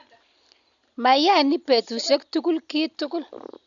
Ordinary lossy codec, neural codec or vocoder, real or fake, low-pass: none; none; real; 7.2 kHz